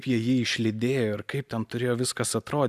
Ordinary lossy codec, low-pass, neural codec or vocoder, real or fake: AAC, 96 kbps; 14.4 kHz; none; real